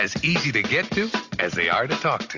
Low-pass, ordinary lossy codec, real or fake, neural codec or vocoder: 7.2 kHz; AAC, 48 kbps; real; none